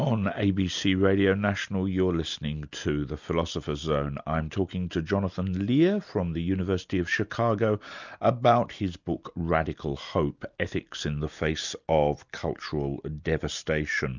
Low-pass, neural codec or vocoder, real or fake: 7.2 kHz; none; real